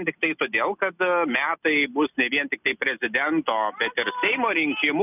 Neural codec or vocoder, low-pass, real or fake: none; 3.6 kHz; real